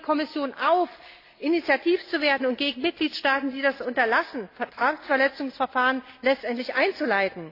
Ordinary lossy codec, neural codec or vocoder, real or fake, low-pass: AAC, 32 kbps; none; real; 5.4 kHz